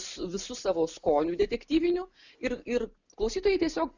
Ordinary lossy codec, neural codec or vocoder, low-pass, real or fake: Opus, 64 kbps; none; 7.2 kHz; real